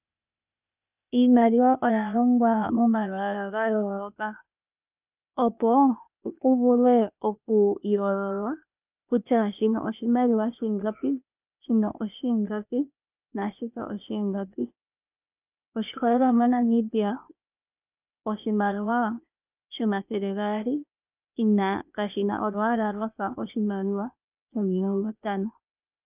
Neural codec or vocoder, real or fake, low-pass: codec, 16 kHz, 0.8 kbps, ZipCodec; fake; 3.6 kHz